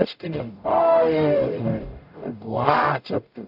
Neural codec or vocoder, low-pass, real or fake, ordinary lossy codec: codec, 44.1 kHz, 0.9 kbps, DAC; 5.4 kHz; fake; none